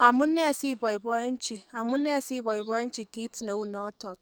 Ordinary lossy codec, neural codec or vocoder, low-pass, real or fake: none; codec, 44.1 kHz, 2.6 kbps, SNAC; none; fake